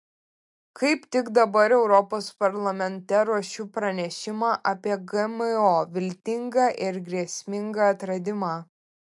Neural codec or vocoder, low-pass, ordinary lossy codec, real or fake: none; 10.8 kHz; MP3, 64 kbps; real